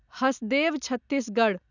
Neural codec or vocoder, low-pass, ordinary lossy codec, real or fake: none; 7.2 kHz; none; real